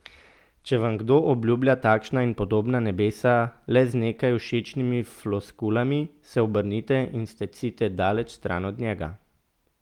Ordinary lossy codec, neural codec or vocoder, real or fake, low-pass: Opus, 24 kbps; none; real; 19.8 kHz